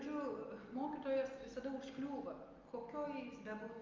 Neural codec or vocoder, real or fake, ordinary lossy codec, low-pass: none; real; Opus, 32 kbps; 7.2 kHz